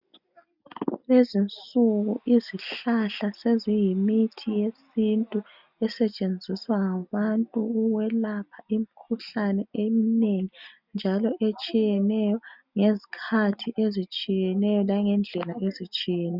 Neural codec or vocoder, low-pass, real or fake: none; 5.4 kHz; real